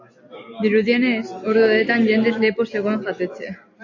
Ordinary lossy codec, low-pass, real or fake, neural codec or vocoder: AAC, 48 kbps; 7.2 kHz; real; none